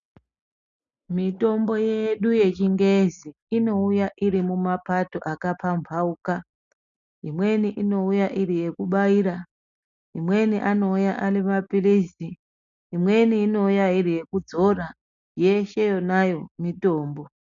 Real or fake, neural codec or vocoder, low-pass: real; none; 7.2 kHz